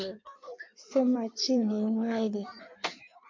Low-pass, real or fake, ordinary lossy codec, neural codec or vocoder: 7.2 kHz; fake; MP3, 48 kbps; codec, 16 kHz in and 24 kHz out, 1.1 kbps, FireRedTTS-2 codec